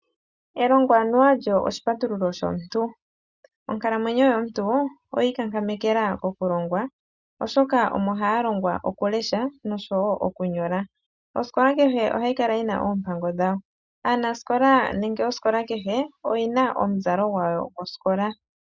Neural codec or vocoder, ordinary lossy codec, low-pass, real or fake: none; Opus, 64 kbps; 7.2 kHz; real